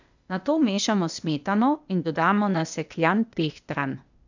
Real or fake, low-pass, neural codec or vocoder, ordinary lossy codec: fake; 7.2 kHz; codec, 16 kHz, 0.8 kbps, ZipCodec; none